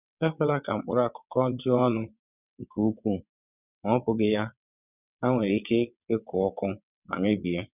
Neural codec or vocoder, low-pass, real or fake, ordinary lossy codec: vocoder, 22.05 kHz, 80 mel bands, WaveNeXt; 3.6 kHz; fake; none